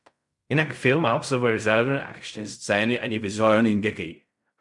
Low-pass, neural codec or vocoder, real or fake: 10.8 kHz; codec, 16 kHz in and 24 kHz out, 0.4 kbps, LongCat-Audio-Codec, fine tuned four codebook decoder; fake